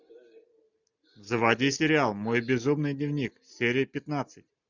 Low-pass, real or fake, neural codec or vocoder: 7.2 kHz; real; none